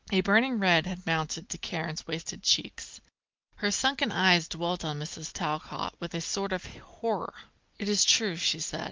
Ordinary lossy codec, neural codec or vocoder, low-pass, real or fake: Opus, 24 kbps; none; 7.2 kHz; real